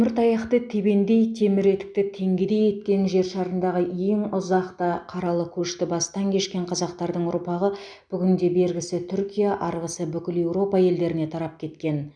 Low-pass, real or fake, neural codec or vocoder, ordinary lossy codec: 9.9 kHz; real; none; none